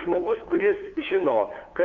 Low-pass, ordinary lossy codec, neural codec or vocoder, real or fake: 7.2 kHz; Opus, 24 kbps; codec, 16 kHz, 2 kbps, FunCodec, trained on LibriTTS, 25 frames a second; fake